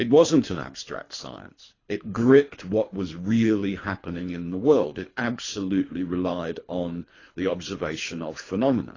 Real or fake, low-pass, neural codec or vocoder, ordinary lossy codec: fake; 7.2 kHz; codec, 24 kHz, 3 kbps, HILCodec; AAC, 32 kbps